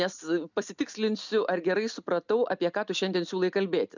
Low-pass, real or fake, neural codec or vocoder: 7.2 kHz; real; none